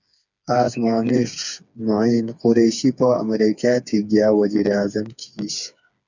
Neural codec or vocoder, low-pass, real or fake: codec, 16 kHz, 4 kbps, FreqCodec, smaller model; 7.2 kHz; fake